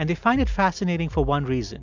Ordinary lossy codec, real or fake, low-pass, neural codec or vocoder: MP3, 64 kbps; real; 7.2 kHz; none